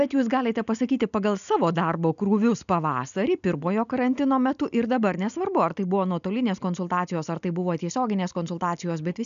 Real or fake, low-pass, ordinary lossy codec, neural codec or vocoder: real; 7.2 kHz; MP3, 96 kbps; none